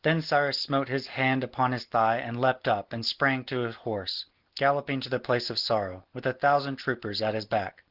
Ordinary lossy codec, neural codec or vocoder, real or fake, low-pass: Opus, 16 kbps; none; real; 5.4 kHz